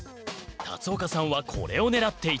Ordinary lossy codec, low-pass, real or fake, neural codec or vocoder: none; none; real; none